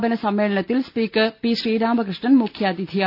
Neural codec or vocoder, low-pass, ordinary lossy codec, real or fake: none; 5.4 kHz; none; real